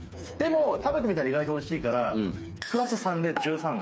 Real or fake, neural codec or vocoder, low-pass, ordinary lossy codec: fake; codec, 16 kHz, 4 kbps, FreqCodec, smaller model; none; none